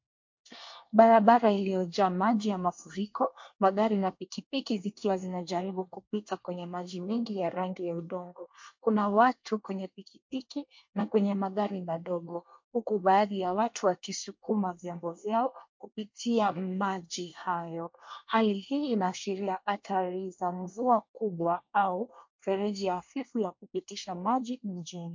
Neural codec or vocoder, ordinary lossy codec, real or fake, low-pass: codec, 24 kHz, 1 kbps, SNAC; MP3, 48 kbps; fake; 7.2 kHz